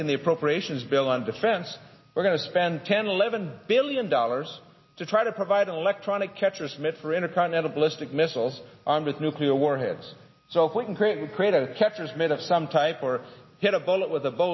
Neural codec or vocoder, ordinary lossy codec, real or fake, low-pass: none; MP3, 24 kbps; real; 7.2 kHz